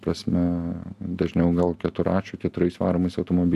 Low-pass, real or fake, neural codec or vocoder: 14.4 kHz; real; none